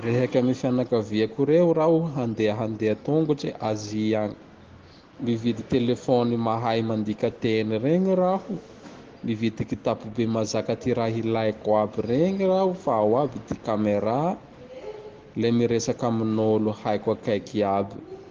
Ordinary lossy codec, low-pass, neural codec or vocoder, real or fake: Opus, 16 kbps; 7.2 kHz; none; real